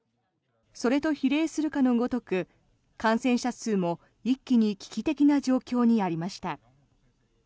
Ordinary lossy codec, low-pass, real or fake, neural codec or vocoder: none; none; real; none